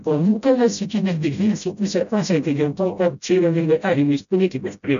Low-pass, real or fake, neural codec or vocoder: 7.2 kHz; fake; codec, 16 kHz, 0.5 kbps, FreqCodec, smaller model